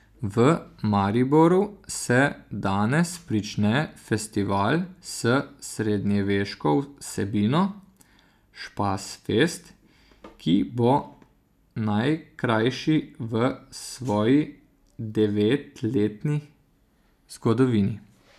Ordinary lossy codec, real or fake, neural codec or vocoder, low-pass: none; real; none; 14.4 kHz